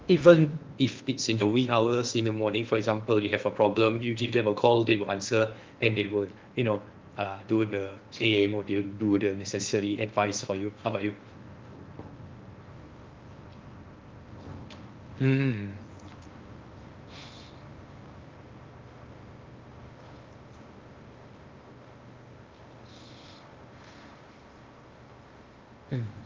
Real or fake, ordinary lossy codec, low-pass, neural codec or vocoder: fake; Opus, 32 kbps; 7.2 kHz; codec, 16 kHz in and 24 kHz out, 0.8 kbps, FocalCodec, streaming, 65536 codes